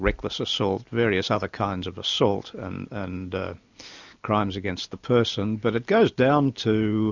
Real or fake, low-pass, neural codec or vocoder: real; 7.2 kHz; none